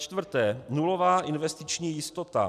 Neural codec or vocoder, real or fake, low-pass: none; real; 14.4 kHz